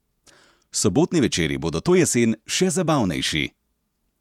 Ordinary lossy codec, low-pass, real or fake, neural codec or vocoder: none; 19.8 kHz; fake; vocoder, 48 kHz, 128 mel bands, Vocos